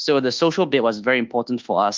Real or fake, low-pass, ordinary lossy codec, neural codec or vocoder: fake; 7.2 kHz; Opus, 32 kbps; codec, 24 kHz, 1.2 kbps, DualCodec